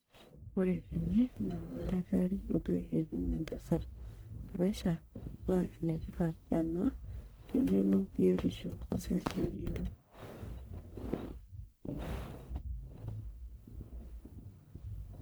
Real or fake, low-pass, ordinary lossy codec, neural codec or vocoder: fake; none; none; codec, 44.1 kHz, 1.7 kbps, Pupu-Codec